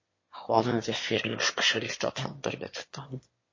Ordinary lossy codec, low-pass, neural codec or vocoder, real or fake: MP3, 32 kbps; 7.2 kHz; autoencoder, 22.05 kHz, a latent of 192 numbers a frame, VITS, trained on one speaker; fake